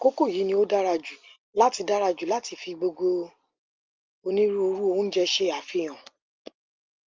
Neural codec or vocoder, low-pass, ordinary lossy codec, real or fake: none; 7.2 kHz; Opus, 32 kbps; real